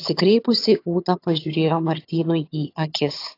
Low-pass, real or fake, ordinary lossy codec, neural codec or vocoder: 5.4 kHz; fake; AAC, 32 kbps; vocoder, 22.05 kHz, 80 mel bands, HiFi-GAN